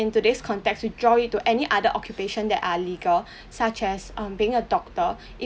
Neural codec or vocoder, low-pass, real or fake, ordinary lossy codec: none; none; real; none